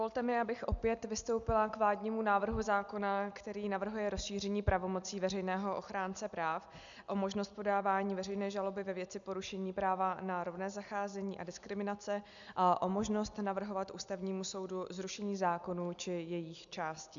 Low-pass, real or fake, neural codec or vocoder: 7.2 kHz; real; none